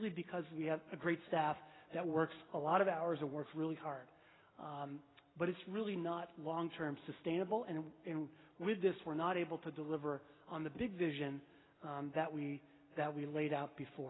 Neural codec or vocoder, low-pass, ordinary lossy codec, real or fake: none; 7.2 kHz; AAC, 16 kbps; real